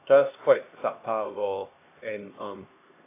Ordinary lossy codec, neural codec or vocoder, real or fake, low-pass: AAC, 24 kbps; codec, 16 kHz, 1 kbps, X-Codec, HuBERT features, trained on LibriSpeech; fake; 3.6 kHz